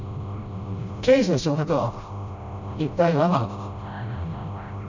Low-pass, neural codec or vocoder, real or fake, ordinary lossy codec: 7.2 kHz; codec, 16 kHz, 0.5 kbps, FreqCodec, smaller model; fake; none